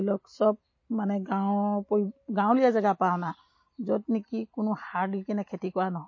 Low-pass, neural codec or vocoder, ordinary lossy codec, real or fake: 7.2 kHz; none; MP3, 32 kbps; real